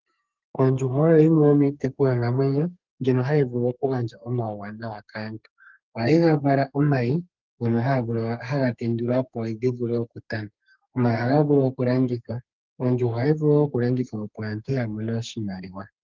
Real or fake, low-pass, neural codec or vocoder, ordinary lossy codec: fake; 7.2 kHz; codec, 32 kHz, 1.9 kbps, SNAC; Opus, 32 kbps